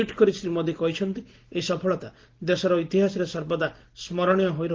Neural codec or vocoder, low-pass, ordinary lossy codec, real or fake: none; 7.2 kHz; Opus, 16 kbps; real